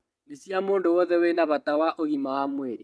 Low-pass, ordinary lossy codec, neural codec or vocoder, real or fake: none; none; vocoder, 22.05 kHz, 80 mel bands, WaveNeXt; fake